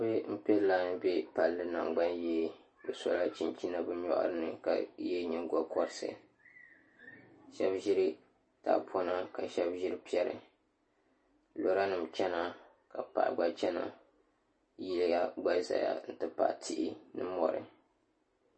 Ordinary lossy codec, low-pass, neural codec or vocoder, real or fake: MP3, 32 kbps; 9.9 kHz; none; real